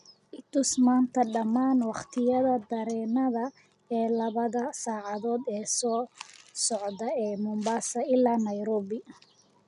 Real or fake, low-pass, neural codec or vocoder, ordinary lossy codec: real; 10.8 kHz; none; none